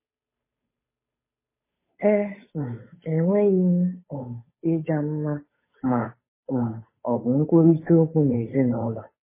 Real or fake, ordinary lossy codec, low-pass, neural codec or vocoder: fake; MP3, 16 kbps; 3.6 kHz; codec, 16 kHz, 8 kbps, FunCodec, trained on Chinese and English, 25 frames a second